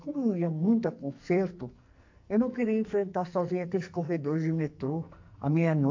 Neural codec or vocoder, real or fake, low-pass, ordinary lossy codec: codec, 44.1 kHz, 2.6 kbps, SNAC; fake; 7.2 kHz; MP3, 48 kbps